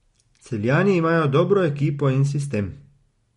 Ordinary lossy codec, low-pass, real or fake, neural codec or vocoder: MP3, 48 kbps; 19.8 kHz; real; none